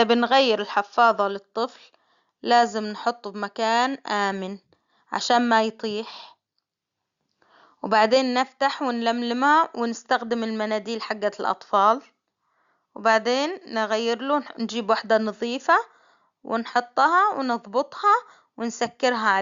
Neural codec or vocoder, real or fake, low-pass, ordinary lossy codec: none; real; 7.2 kHz; Opus, 64 kbps